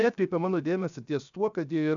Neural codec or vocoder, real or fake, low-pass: codec, 16 kHz, 0.7 kbps, FocalCodec; fake; 7.2 kHz